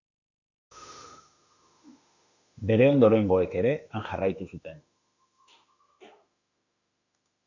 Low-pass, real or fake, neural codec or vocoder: 7.2 kHz; fake; autoencoder, 48 kHz, 32 numbers a frame, DAC-VAE, trained on Japanese speech